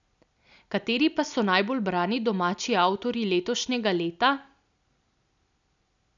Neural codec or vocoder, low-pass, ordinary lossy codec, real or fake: none; 7.2 kHz; none; real